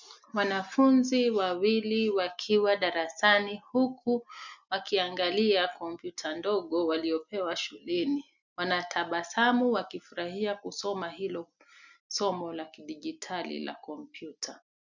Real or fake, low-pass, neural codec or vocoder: real; 7.2 kHz; none